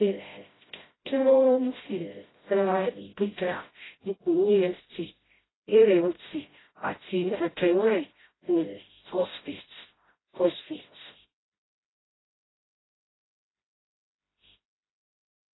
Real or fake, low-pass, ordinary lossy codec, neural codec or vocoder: fake; 7.2 kHz; AAC, 16 kbps; codec, 16 kHz, 0.5 kbps, FreqCodec, smaller model